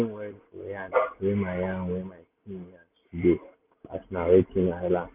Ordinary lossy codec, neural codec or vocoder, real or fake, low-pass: none; none; real; 3.6 kHz